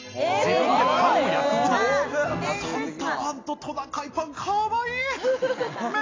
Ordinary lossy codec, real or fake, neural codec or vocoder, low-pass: AAC, 32 kbps; real; none; 7.2 kHz